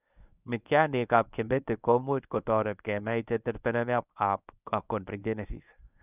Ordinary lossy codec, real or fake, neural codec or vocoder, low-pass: none; fake; codec, 24 kHz, 0.9 kbps, WavTokenizer, medium speech release version 1; 3.6 kHz